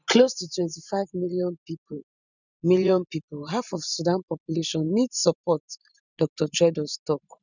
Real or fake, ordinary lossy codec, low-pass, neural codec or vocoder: fake; none; 7.2 kHz; vocoder, 44.1 kHz, 128 mel bands every 512 samples, BigVGAN v2